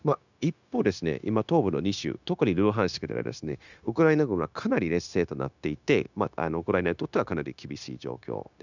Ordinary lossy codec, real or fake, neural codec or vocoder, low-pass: none; fake; codec, 16 kHz, 0.9 kbps, LongCat-Audio-Codec; 7.2 kHz